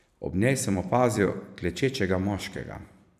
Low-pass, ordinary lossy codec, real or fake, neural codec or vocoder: 14.4 kHz; none; fake; vocoder, 44.1 kHz, 128 mel bands every 256 samples, BigVGAN v2